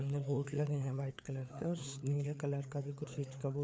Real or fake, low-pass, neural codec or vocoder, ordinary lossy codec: fake; none; codec, 16 kHz, 4 kbps, FreqCodec, larger model; none